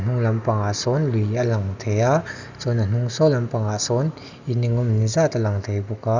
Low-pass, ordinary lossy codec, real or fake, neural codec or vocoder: 7.2 kHz; none; real; none